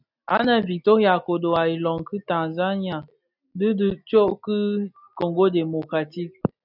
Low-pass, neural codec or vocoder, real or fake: 5.4 kHz; none; real